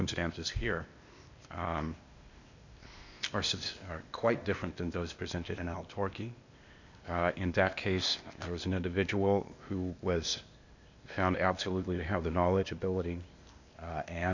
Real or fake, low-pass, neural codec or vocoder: fake; 7.2 kHz; codec, 16 kHz, 0.8 kbps, ZipCodec